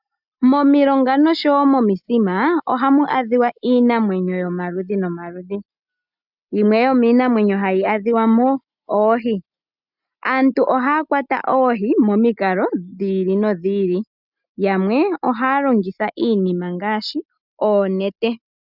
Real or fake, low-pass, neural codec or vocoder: real; 5.4 kHz; none